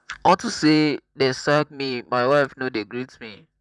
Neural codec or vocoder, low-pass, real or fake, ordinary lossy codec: vocoder, 44.1 kHz, 128 mel bands, Pupu-Vocoder; 10.8 kHz; fake; none